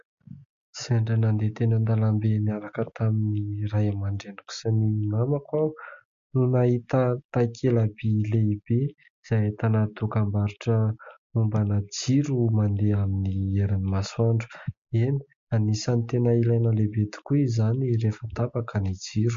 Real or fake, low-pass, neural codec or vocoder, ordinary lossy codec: real; 7.2 kHz; none; MP3, 48 kbps